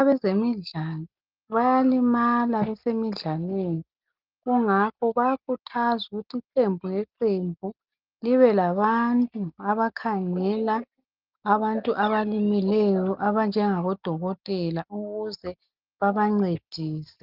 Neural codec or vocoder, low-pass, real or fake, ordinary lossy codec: none; 5.4 kHz; real; Opus, 32 kbps